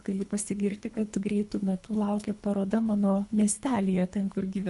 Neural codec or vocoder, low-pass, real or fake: codec, 24 kHz, 3 kbps, HILCodec; 10.8 kHz; fake